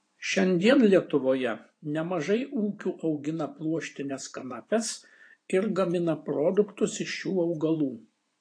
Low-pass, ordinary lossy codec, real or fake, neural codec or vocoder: 9.9 kHz; AAC, 48 kbps; fake; vocoder, 24 kHz, 100 mel bands, Vocos